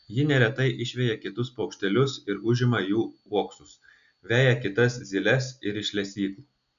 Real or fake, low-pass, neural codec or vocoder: real; 7.2 kHz; none